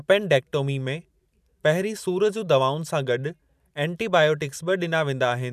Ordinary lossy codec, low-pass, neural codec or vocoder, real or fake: none; 14.4 kHz; vocoder, 44.1 kHz, 128 mel bands every 512 samples, BigVGAN v2; fake